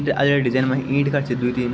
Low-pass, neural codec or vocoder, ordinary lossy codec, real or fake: none; none; none; real